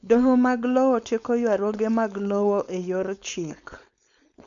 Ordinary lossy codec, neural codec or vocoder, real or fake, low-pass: none; codec, 16 kHz, 4.8 kbps, FACodec; fake; 7.2 kHz